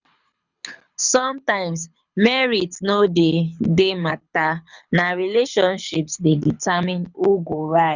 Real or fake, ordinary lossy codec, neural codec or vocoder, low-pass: fake; none; codec, 24 kHz, 6 kbps, HILCodec; 7.2 kHz